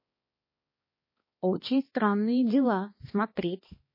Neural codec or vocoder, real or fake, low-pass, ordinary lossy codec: codec, 16 kHz, 2 kbps, X-Codec, HuBERT features, trained on balanced general audio; fake; 5.4 kHz; MP3, 24 kbps